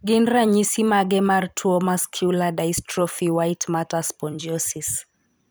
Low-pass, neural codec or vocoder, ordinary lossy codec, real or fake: none; vocoder, 44.1 kHz, 128 mel bands every 256 samples, BigVGAN v2; none; fake